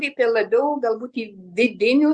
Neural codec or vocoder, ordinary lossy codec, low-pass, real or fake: none; Opus, 64 kbps; 9.9 kHz; real